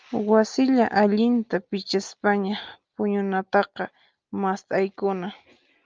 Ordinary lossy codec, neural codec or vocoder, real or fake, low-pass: Opus, 24 kbps; none; real; 7.2 kHz